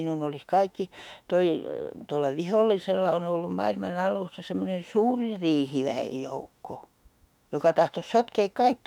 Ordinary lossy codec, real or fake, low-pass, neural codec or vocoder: none; fake; 19.8 kHz; autoencoder, 48 kHz, 32 numbers a frame, DAC-VAE, trained on Japanese speech